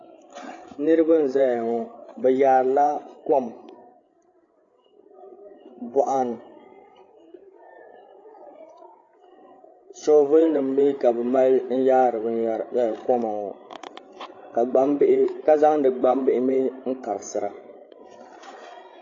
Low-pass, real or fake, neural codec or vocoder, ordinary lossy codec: 7.2 kHz; fake; codec, 16 kHz, 8 kbps, FreqCodec, larger model; AAC, 32 kbps